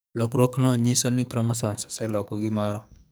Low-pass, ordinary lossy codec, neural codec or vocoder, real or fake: none; none; codec, 44.1 kHz, 2.6 kbps, SNAC; fake